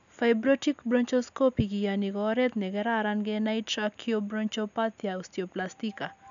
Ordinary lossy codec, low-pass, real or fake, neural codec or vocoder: none; 7.2 kHz; real; none